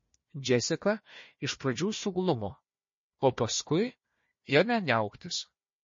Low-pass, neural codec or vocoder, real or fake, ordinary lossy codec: 7.2 kHz; codec, 16 kHz, 1 kbps, FunCodec, trained on Chinese and English, 50 frames a second; fake; MP3, 32 kbps